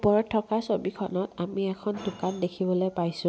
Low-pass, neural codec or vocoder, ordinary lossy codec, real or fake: none; none; none; real